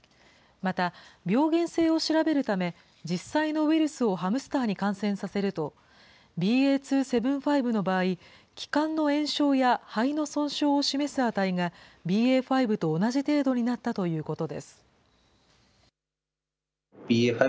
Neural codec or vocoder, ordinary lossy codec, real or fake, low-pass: none; none; real; none